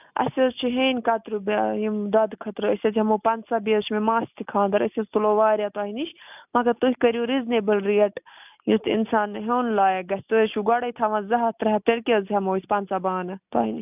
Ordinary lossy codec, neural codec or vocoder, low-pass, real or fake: none; none; 3.6 kHz; real